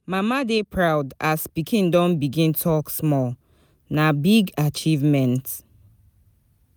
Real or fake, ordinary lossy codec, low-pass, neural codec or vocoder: real; none; none; none